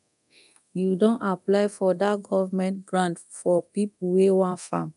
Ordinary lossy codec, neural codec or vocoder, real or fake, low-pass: none; codec, 24 kHz, 0.9 kbps, DualCodec; fake; 10.8 kHz